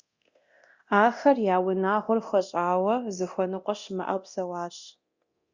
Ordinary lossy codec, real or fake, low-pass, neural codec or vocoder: Opus, 64 kbps; fake; 7.2 kHz; codec, 24 kHz, 0.9 kbps, DualCodec